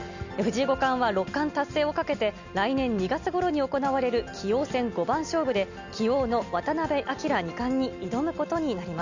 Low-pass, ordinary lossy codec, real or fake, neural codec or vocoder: 7.2 kHz; none; real; none